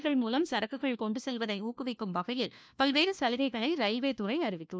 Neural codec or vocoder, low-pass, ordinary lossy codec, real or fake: codec, 16 kHz, 1 kbps, FunCodec, trained on LibriTTS, 50 frames a second; none; none; fake